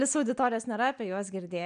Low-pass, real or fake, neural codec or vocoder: 9.9 kHz; real; none